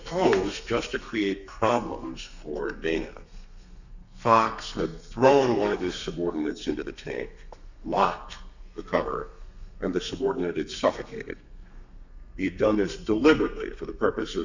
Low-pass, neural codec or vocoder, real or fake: 7.2 kHz; codec, 32 kHz, 1.9 kbps, SNAC; fake